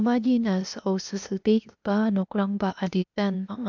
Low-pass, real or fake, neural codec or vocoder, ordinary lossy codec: 7.2 kHz; fake; codec, 16 kHz, 0.8 kbps, ZipCodec; none